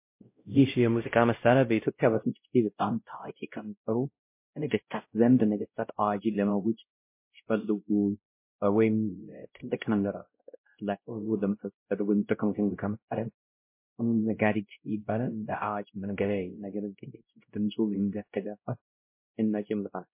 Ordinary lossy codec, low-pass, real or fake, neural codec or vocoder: MP3, 24 kbps; 3.6 kHz; fake; codec, 16 kHz, 0.5 kbps, X-Codec, WavLM features, trained on Multilingual LibriSpeech